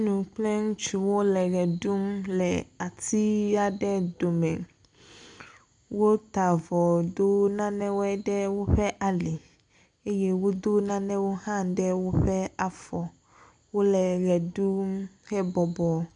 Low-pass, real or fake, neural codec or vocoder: 9.9 kHz; real; none